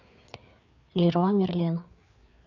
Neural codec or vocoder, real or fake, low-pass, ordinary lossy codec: codec, 16 kHz, 4 kbps, FreqCodec, larger model; fake; 7.2 kHz; none